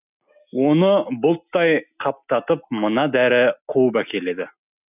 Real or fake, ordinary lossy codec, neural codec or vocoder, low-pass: real; none; none; 3.6 kHz